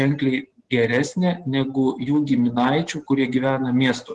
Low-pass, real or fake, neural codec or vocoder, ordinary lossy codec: 10.8 kHz; real; none; Opus, 16 kbps